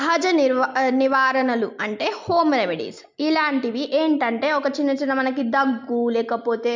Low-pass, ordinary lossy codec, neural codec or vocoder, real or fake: 7.2 kHz; MP3, 64 kbps; none; real